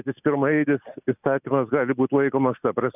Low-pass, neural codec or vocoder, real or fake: 3.6 kHz; none; real